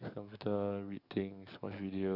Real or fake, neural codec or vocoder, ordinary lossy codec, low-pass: fake; codec, 44.1 kHz, 7.8 kbps, DAC; none; 5.4 kHz